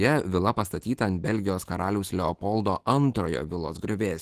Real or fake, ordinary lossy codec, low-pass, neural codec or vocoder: fake; Opus, 16 kbps; 14.4 kHz; autoencoder, 48 kHz, 128 numbers a frame, DAC-VAE, trained on Japanese speech